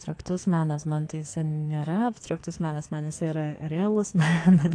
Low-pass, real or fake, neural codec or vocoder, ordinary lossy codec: 9.9 kHz; fake; codec, 44.1 kHz, 2.6 kbps, SNAC; AAC, 48 kbps